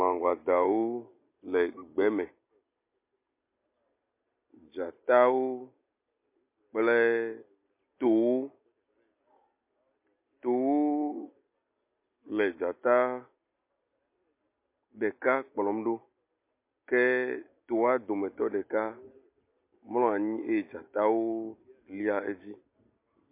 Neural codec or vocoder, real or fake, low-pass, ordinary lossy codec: none; real; 3.6 kHz; MP3, 24 kbps